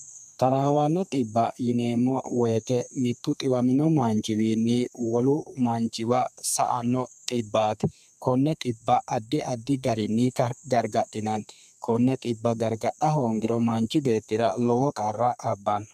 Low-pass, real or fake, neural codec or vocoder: 14.4 kHz; fake; codec, 32 kHz, 1.9 kbps, SNAC